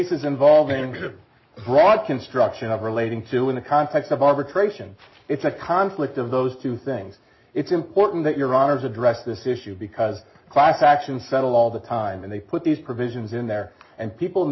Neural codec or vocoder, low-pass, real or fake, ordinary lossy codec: none; 7.2 kHz; real; MP3, 24 kbps